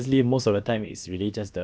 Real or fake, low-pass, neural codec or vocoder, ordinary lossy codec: fake; none; codec, 16 kHz, about 1 kbps, DyCAST, with the encoder's durations; none